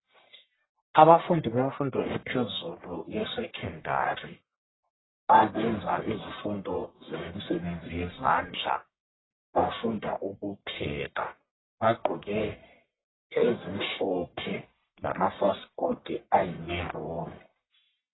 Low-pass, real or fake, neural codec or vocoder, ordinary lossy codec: 7.2 kHz; fake; codec, 44.1 kHz, 1.7 kbps, Pupu-Codec; AAC, 16 kbps